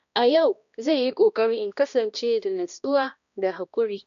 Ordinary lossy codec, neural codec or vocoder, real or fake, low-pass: none; codec, 16 kHz, 1 kbps, X-Codec, HuBERT features, trained on balanced general audio; fake; 7.2 kHz